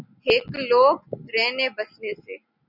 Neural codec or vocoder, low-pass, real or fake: none; 5.4 kHz; real